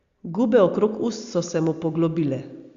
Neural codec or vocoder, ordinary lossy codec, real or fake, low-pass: none; Opus, 64 kbps; real; 7.2 kHz